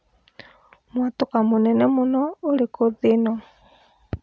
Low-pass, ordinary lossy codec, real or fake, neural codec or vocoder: none; none; real; none